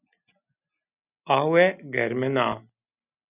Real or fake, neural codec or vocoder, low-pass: real; none; 3.6 kHz